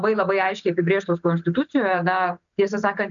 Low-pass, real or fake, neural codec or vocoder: 7.2 kHz; real; none